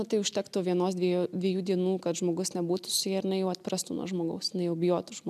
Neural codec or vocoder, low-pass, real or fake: none; 14.4 kHz; real